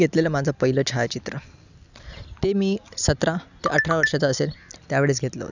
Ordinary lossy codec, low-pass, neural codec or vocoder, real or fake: none; 7.2 kHz; none; real